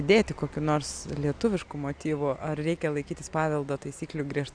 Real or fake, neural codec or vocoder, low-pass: real; none; 9.9 kHz